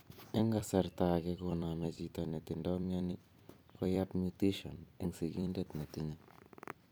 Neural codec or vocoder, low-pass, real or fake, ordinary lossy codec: vocoder, 44.1 kHz, 128 mel bands every 256 samples, BigVGAN v2; none; fake; none